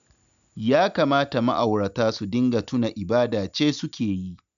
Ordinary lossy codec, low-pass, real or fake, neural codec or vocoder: none; 7.2 kHz; real; none